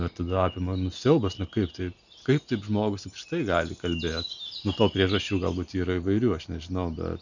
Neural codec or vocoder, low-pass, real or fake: none; 7.2 kHz; real